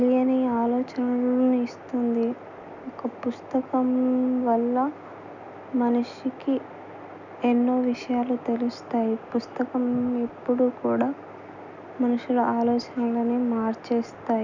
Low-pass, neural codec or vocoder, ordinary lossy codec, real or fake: 7.2 kHz; none; none; real